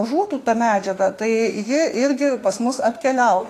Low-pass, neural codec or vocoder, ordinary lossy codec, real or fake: 14.4 kHz; autoencoder, 48 kHz, 32 numbers a frame, DAC-VAE, trained on Japanese speech; AAC, 48 kbps; fake